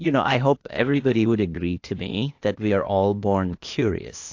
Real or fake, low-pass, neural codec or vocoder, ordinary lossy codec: fake; 7.2 kHz; codec, 16 kHz, 0.8 kbps, ZipCodec; AAC, 48 kbps